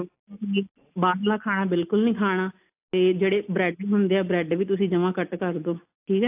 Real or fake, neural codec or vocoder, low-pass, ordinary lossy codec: real; none; 3.6 kHz; AAC, 32 kbps